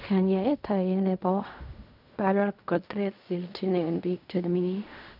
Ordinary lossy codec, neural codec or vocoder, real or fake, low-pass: none; codec, 16 kHz in and 24 kHz out, 0.4 kbps, LongCat-Audio-Codec, fine tuned four codebook decoder; fake; 5.4 kHz